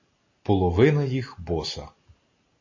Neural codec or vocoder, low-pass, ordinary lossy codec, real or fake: none; 7.2 kHz; MP3, 32 kbps; real